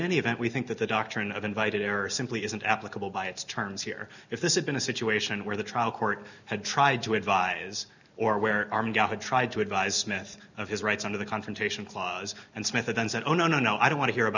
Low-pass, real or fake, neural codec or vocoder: 7.2 kHz; real; none